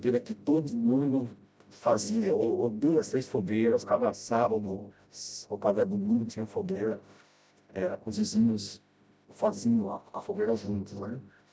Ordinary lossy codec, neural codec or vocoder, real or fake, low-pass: none; codec, 16 kHz, 0.5 kbps, FreqCodec, smaller model; fake; none